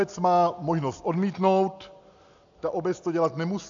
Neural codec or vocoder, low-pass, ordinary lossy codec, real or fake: none; 7.2 kHz; AAC, 64 kbps; real